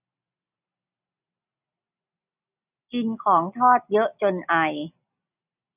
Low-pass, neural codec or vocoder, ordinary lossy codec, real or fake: 3.6 kHz; none; none; real